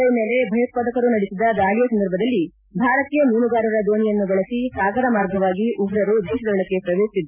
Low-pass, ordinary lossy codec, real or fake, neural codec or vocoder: 3.6 kHz; none; real; none